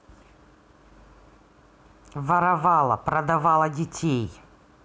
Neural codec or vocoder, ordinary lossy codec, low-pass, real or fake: none; none; none; real